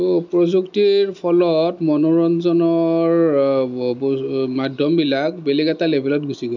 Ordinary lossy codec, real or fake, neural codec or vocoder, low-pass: none; real; none; 7.2 kHz